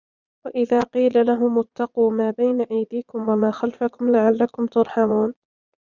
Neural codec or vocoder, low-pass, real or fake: vocoder, 22.05 kHz, 80 mel bands, Vocos; 7.2 kHz; fake